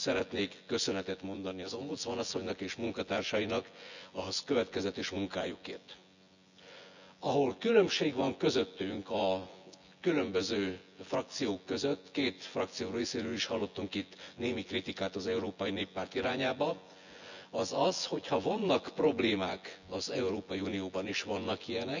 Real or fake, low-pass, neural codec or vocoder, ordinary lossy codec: fake; 7.2 kHz; vocoder, 24 kHz, 100 mel bands, Vocos; none